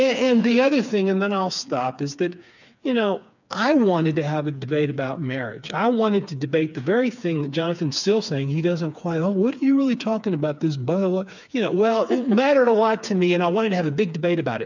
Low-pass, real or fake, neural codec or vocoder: 7.2 kHz; fake; codec, 16 kHz, 4 kbps, FreqCodec, smaller model